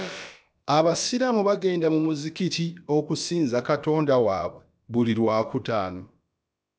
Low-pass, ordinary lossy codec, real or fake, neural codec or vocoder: none; none; fake; codec, 16 kHz, about 1 kbps, DyCAST, with the encoder's durations